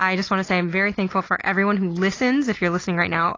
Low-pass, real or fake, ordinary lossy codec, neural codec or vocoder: 7.2 kHz; real; AAC, 32 kbps; none